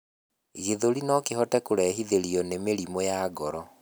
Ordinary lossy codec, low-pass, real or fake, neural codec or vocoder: none; none; real; none